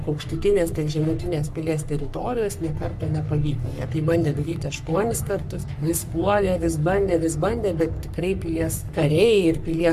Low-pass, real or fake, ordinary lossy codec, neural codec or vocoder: 14.4 kHz; fake; MP3, 96 kbps; codec, 44.1 kHz, 3.4 kbps, Pupu-Codec